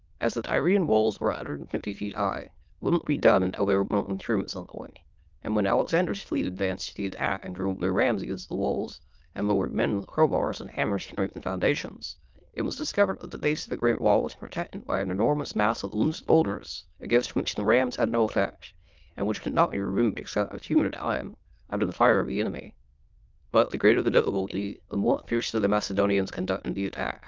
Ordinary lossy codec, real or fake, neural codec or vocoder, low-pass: Opus, 32 kbps; fake; autoencoder, 22.05 kHz, a latent of 192 numbers a frame, VITS, trained on many speakers; 7.2 kHz